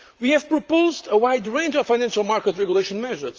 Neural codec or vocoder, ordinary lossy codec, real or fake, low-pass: vocoder, 44.1 kHz, 128 mel bands, Pupu-Vocoder; Opus, 24 kbps; fake; 7.2 kHz